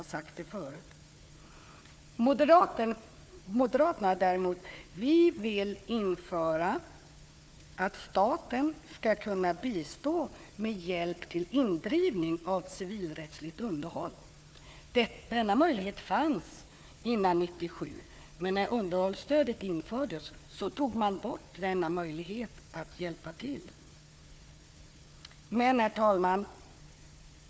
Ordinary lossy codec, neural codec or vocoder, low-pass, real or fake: none; codec, 16 kHz, 4 kbps, FunCodec, trained on Chinese and English, 50 frames a second; none; fake